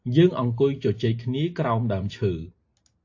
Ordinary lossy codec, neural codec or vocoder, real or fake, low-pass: AAC, 48 kbps; none; real; 7.2 kHz